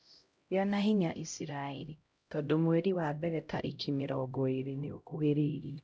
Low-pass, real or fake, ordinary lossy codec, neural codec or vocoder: none; fake; none; codec, 16 kHz, 0.5 kbps, X-Codec, HuBERT features, trained on LibriSpeech